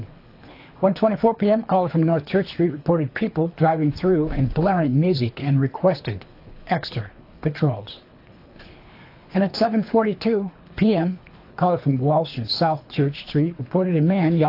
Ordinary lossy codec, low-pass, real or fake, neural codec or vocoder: AAC, 32 kbps; 5.4 kHz; fake; codec, 24 kHz, 3 kbps, HILCodec